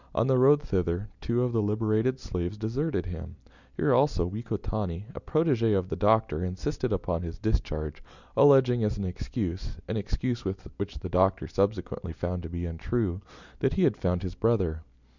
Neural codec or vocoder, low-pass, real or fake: none; 7.2 kHz; real